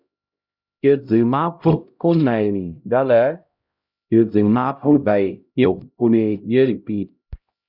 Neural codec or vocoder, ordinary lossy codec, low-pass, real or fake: codec, 16 kHz, 0.5 kbps, X-Codec, HuBERT features, trained on LibriSpeech; Opus, 64 kbps; 5.4 kHz; fake